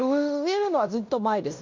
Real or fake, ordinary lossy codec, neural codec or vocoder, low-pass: fake; MP3, 48 kbps; codec, 16 kHz in and 24 kHz out, 0.9 kbps, LongCat-Audio-Codec, fine tuned four codebook decoder; 7.2 kHz